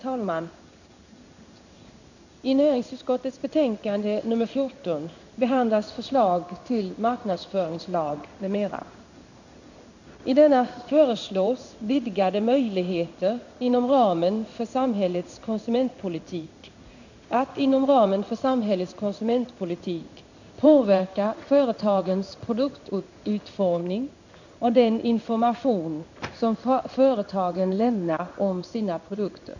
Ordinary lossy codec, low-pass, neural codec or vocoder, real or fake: none; 7.2 kHz; codec, 16 kHz in and 24 kHz out, 1 kbps, XY-Tokenizer; fake